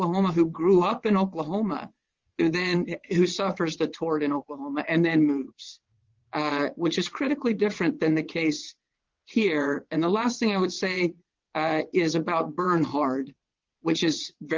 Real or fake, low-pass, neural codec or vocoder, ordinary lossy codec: fake; 7.2 kHz; vocoder, 22.05 kHz, 80 mel bands, WaveNeXt; Opus, 32 kbps